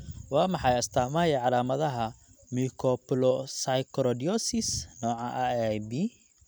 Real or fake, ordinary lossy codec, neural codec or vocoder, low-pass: real; none; none; none